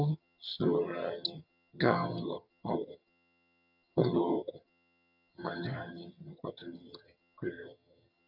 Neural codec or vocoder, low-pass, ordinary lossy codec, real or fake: vocoder, 22.05 kHz, 80 mel bands, HiFi-GAN; 5.4 kHz; none; fake